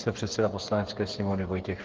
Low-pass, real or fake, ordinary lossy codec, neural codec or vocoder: 7.2 kHz; fake; Opus, 16 kbps; codec, 16 kHz, 8 kbps, FreqCodec, smaller model